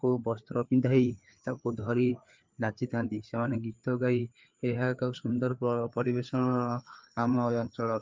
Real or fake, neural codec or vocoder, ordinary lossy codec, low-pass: fake; codec, 16 kHz, 4 kbps, FreqCodec, larger model; Opus, 32 kbps; 7.2 kHz